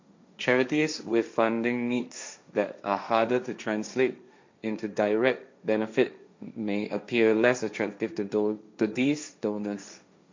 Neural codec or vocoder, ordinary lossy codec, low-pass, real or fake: codec, 16 kHz, 1.1 kbps, Voila-Tokenizer; MP3, 64 kbps; 7.2 kHz; fake